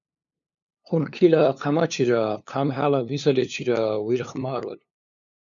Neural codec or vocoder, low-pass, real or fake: codec, 16 kHz, 2 kbps, FunCodec, trained on LibriTTS, 25 frames a second; 7.2 kHz; fake